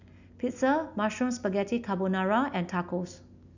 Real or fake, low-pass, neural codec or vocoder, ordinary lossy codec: real; 7.2 kHz; none; none